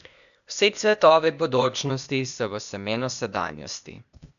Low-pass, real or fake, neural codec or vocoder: 7.2 kHz; fake; codec, 16 kHz, 0.8 kbps, ZipCodec